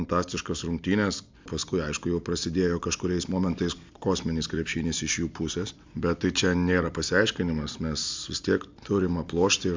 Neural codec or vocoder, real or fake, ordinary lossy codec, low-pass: none; real; MP3, 64 kbps; 7.2 kHz